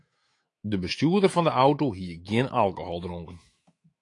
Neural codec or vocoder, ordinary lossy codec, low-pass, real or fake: autoencoder, 48 kHz, 128 numbers a frame, DAC-VAE, trained on Japanese speech; AAC, 48 kbps; 10.8 kHz; fake